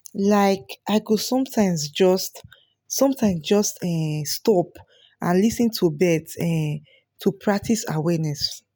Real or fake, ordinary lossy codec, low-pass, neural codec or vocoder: real; none; none; none